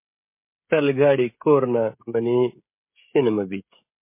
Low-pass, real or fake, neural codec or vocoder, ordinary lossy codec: 3.6 kHz; fake; codec, 16 kHz, 16 kbps, FreqCodec, smaller model; MP3, 24 kbps